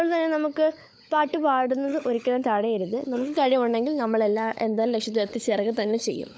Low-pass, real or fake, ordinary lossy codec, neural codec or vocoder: none; fake; none; codec, 16 kHz, 16 kbps, FunCodec, trained on LibriTTS, 50 frames a second